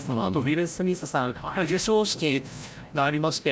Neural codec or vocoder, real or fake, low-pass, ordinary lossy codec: codec, 16 kHz, 0.5 kbps, FreqCodec, larger model; fake; none; none